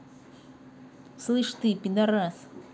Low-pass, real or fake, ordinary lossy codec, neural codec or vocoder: none; real; none; none